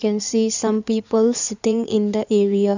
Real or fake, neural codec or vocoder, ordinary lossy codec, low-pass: fake; codec, 16 kHz in and 24 kHz out, 2.2 kbps, FireRedTTS-2 codec; none; 7.2 kHz